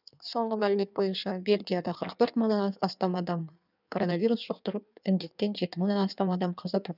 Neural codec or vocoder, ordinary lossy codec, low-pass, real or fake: codec, 16 kHz in and 24 kHz out, 1.1 kbps, FireRedTTS-2 codec; none; 5.4 kHz; fake